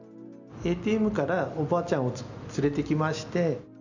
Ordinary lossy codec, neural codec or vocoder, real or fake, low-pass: none; none; real; 7.2 kHz